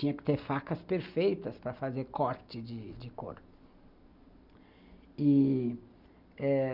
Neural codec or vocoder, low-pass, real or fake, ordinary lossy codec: none; 5.4 kHz; real; none